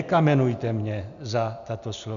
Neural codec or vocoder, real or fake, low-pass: none; real; 7.2 kHz